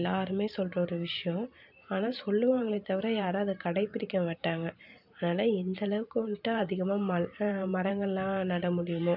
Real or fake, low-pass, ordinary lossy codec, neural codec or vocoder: fake; 5.4 kHz; none; vocoder, 44.1 kHz, 128 mel bands every 512 samples, BigVGAN v2